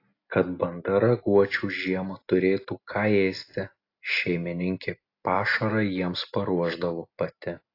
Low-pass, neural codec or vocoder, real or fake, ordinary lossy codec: 5.4 kHz; none; real; AAC, 32 kbps